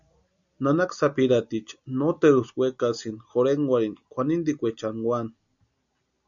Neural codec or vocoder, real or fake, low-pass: none; real; 7.2 kHz